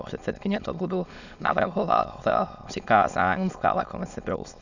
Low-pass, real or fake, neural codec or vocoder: 7.2 kHz; fake; autoencoder, 22.05 kHz, a latent of 192 numbers a frame, VITS, trained on many speakers